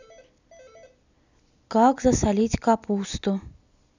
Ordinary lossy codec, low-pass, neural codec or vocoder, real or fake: none; 7.2 kHz; none; real